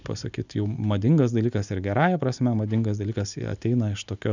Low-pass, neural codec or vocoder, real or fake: 7.2 kHz; none; real